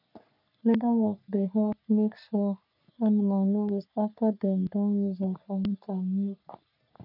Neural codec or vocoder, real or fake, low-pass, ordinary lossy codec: codec, 44.1 kHz, 3.4 kbps, Pupu-Codec; fake; 5.4 kHz; none